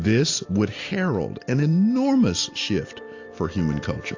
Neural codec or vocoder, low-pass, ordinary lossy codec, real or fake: none; 7.2 kHz; AAC, 48 kbps; real